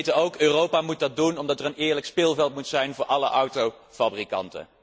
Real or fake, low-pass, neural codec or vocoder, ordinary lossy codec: real; none; none; none